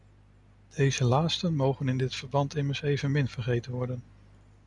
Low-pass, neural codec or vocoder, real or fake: 10.8 kHz; none; real